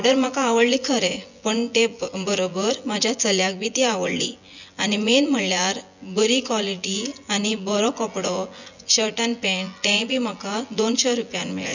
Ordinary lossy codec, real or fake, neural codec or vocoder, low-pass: none; fake; vocoder, 24 kHz, 100 mel bands, Vocos; 7.2 kHz